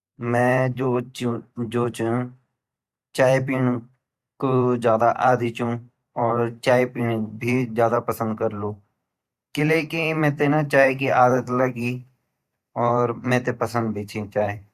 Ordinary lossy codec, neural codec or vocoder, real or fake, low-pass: Opus, 64 kbps; vocoder, 44.1 kHz, 128 mel bands every 512 samples, BigVGAN v2; fake; 14.4 kHz